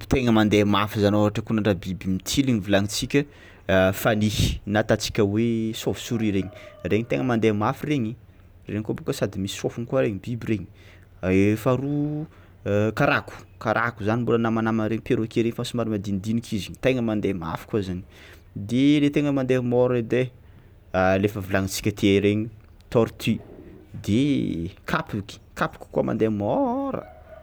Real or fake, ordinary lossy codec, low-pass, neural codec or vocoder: real; none; none; none